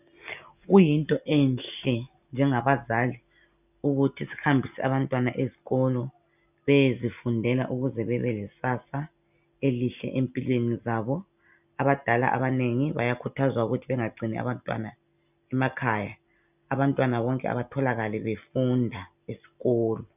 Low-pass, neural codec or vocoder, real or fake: 3.6 kHz; none; real